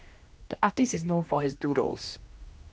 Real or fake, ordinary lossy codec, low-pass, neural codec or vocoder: fake; none; none; codec, 16 kHz, 1 kbps, X-Codec, HuBERT features, trained on general audio